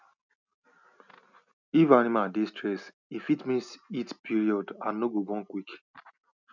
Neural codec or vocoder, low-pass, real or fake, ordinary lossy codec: none; 7.2 kHz; real; none